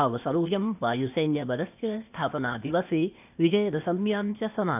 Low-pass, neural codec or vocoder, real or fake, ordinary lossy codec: 3.6 kHz; codec, 16 kHz, 0.8 kbps, ZipCodec; fake; none